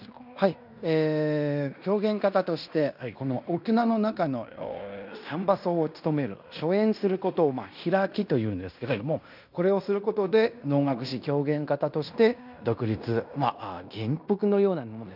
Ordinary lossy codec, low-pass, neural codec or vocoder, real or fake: none; 5.4 kHz; codec, 16 kHz in and 24 kHz out, 0.9 kbps, LongCat-Audio-Codec, fine tuned four codebook decoder; fake